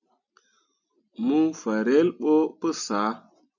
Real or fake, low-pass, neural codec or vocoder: real; 7.2 kHz; none